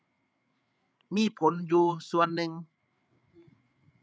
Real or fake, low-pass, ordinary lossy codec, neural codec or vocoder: fake; none; none; codec, 16 kHz, 8 kbps, FreqCodec, larger model